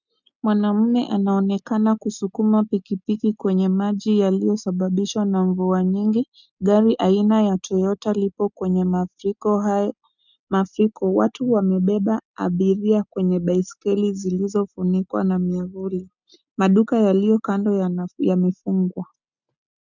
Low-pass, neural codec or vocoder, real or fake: 7.2 kHz; none; real